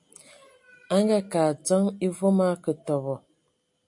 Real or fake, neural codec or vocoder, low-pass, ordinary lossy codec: real; none; 10.8 kHz; MP3, 64 kbps